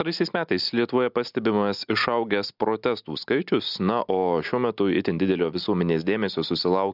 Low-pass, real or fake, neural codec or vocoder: 5.4 kHz; real; none